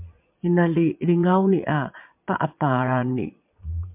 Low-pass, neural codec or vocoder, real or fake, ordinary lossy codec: 3.6 kHz; vocoder, 22.05 kHz, 80 mel bands, Vocos; fake; MP3, 32 kbps